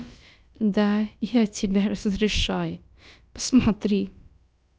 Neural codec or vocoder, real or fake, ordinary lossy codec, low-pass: codec, 16 kHz, about 1 kbps, DyCAST, with the encoder's durations; fake; none; none